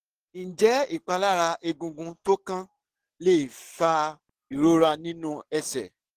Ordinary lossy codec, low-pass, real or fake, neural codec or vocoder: Opus, 16 kbps; 14.4 kHz; fake; codec, 44.1 kHz, 7.8 kbps, DAC